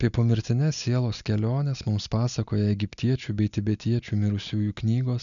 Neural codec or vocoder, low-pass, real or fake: none; 7.2 kHz; real